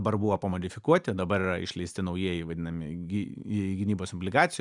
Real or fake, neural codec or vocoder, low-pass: real; none; 10.8 kHz